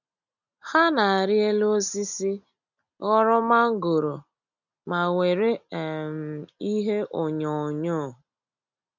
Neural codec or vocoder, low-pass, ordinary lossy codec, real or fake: none; 7.2 kHz; none; real